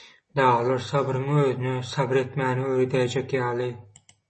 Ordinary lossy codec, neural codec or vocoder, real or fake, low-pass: MP3, 32 kbps; none; real; 10.8 kHz